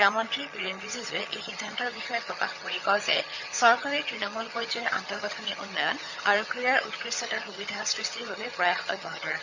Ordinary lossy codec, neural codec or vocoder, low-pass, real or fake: Opus, 64 kbps; vocoder, 22.05 kHz, 80 mel bands, HiFi-GAN; 7.2 kHz; fake